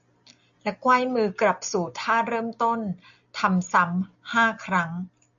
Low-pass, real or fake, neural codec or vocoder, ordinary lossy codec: 7.2 kHz; real; none; AAC, 64 kbps